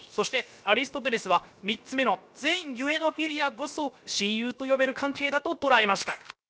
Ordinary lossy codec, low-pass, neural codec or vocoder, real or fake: none; none; codec, 16 kHz, 0.7 kbps, FocalCodec; fake